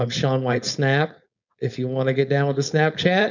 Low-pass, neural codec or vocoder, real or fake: 7.2 kHz; codec, 16 kHz, 4.8 kbps, FACodec; fake